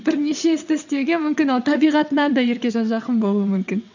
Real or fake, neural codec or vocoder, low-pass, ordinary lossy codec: fake; vocoder, 22.05 kHz, 80 mel bands, WaveNeXt; 7.2 kHz; none